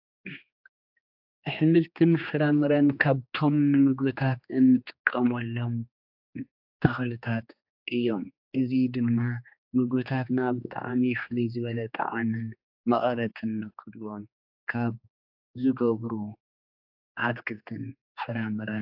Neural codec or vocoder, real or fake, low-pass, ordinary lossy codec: codec, 16 kHz, 2 kbps, X-Codec, HuBERT features, trained on general audio; fake; 5.4 kHz; AAC, 48 kbps